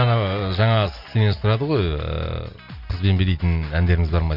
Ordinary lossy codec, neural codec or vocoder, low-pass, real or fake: none; none; 5.4 kHz; real